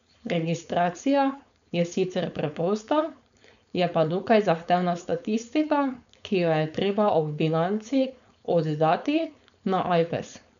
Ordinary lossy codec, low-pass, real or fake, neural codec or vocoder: none; 7.2 kHz; fake; codec, 16 kHz, 4.8 kbps, FACodec